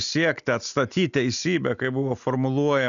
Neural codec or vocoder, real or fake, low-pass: none; real; 7.2 kHz